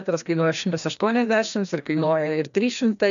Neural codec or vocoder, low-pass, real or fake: codec, 16 kHz, 1 kbps, FreqCodec, larger model; 7.2 kHz; fake